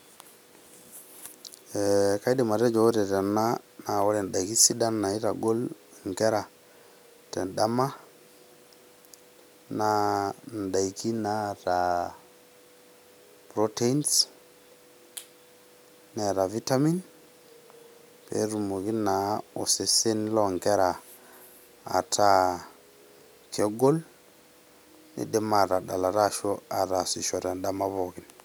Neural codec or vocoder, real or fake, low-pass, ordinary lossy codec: none; real; none; none